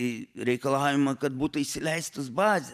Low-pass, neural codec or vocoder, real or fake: 14.4 kHz; vocoder, 44.1 kHz, 128 mel bands every 512 samples, BigVGAN v2; fake